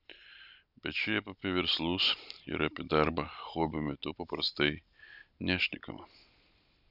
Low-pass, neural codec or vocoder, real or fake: 5.4 kHz; none; real